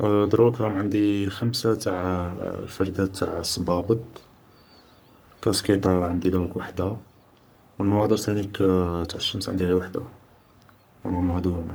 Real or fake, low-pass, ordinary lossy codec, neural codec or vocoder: fake; none; none; codec, 44.1 kHz, 3.4 kbps, Pupu-Codec